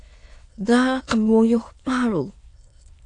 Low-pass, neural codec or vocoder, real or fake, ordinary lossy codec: 9.9 kHz; autoencoder, 22.05 kHz, a latent of 192 numbers a frame, VITS, trained on many speakers; fake; AAC, 48 kbps